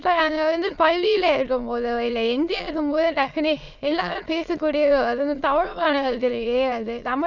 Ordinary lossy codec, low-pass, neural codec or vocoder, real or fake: none; 7.2 kHz; autoencoder, 22.05 kHz, a latent of 192 numbers a frame, VITS, trained on many speakers; fake